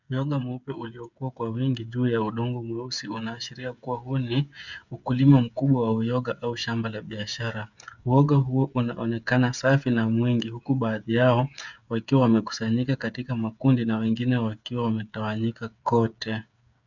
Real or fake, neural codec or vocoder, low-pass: fake; codec, 16 kHz, 8 kbps, FreqCodec, smaller model; 7.2 kHz